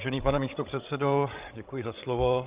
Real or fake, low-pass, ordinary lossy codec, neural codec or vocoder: fake; 3.6 kHz; Opus, 24 kbps; codec, 16 kHz, 16 kbps, FreqCodec, larger model